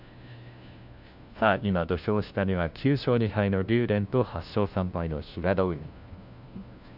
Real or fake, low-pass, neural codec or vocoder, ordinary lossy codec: fake; 5.4 kHz; codec, 16 kHz, 1 kbps, FunCodec, trained on LibriTTS, 50 frames a second; none